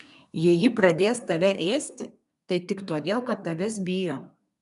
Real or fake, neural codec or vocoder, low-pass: fake; codec, 24 kHz, 1 kbps, SNAC; 10.8 kHz